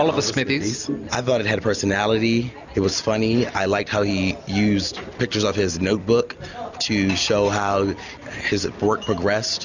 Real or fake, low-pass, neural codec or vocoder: real; 7.2 kHz; none